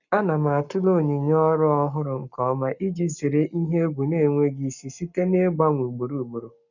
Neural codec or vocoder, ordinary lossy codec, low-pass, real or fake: codec, 44.1 kHz, 7.8 kbps, Pupu-Codec; none; 7.2 kHz; fake